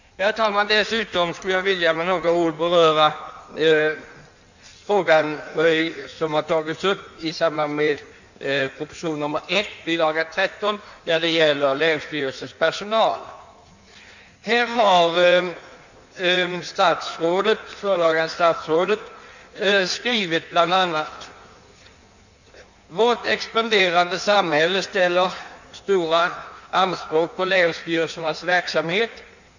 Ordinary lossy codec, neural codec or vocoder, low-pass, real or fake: none; codec, 16 kHz in and 24 kHz out, 1.1 kbps, FireRedTTS-2 codec; 7.2 kHz; fake